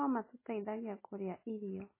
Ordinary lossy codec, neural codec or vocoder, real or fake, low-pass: MP3, 16 kbps; none; real; 3.6 kHz